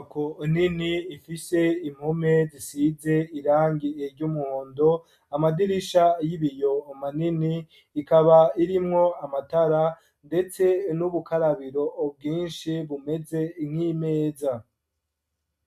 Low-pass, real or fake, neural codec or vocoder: 14.4 kHz; real; none